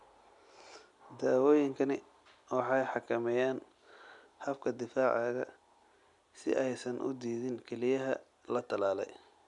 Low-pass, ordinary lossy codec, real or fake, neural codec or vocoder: 10.8 kHz; none; real; none